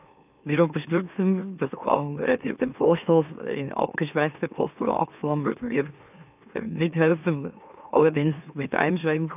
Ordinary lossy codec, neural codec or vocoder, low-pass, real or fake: none; autoencoder, 44.1 kHz, a latent of 192 numbers a frame, MeloTTS; 3.6 kHz; fake